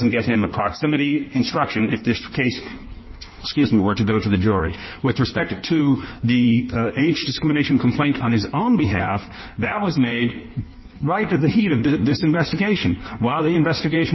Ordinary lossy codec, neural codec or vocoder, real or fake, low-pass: MP3, 24 kbps; codec, 16 kHz in and 24 kHz out, 1.1 kbps, FireRedTTS-2 codec; fake; 7.2 kHz